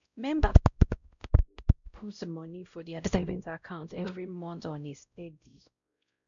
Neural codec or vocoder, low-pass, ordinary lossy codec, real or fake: codec, 16 kHz, 0.5 kbps, X-Codec, WavLM features, trained on Multilingual LibriSpeech; 7.2 kHz; none; fake